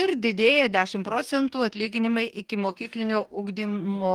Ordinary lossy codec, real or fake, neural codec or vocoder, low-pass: Opus, 32 kbps; fake; codec, 44.1 kHz, 2.6 kbps, DAC; 19.8 kHz